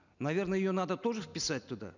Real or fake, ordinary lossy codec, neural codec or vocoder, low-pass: real; none; none; 7.2 kHz